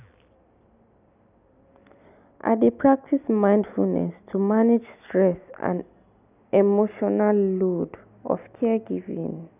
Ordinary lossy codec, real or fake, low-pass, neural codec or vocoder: none; real; 3.6 kHz; none